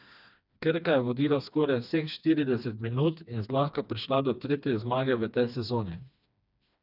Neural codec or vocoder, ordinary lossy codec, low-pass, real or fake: codec, 16 kHz, 2 kbps, FreqCodec, smaller model; none; 5.4 kHz; fake